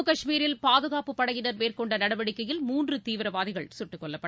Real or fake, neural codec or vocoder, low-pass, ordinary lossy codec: real; none; none; none